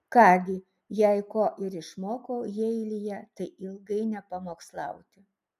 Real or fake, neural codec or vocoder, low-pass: real; none; 14.4 kHz